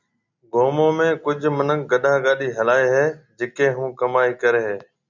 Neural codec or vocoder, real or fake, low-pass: none; real; 7.2 kHz